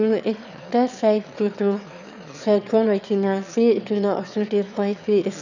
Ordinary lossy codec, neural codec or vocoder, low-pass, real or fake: none; autoencoder, 22.05 kHz, a latent of 192 numbers a frame, VITS, trained on one speaker; 7.2 kHz; fake